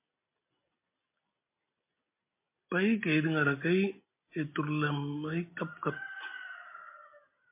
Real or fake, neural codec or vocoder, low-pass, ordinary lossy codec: real; none; 3.6 kHz; MP3, 24 kbps